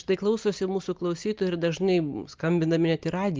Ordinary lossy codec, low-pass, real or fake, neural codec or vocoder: Opus, 24 kbps; 7.2 kHz; real; none